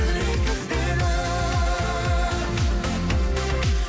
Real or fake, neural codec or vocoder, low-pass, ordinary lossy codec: real; none; none; none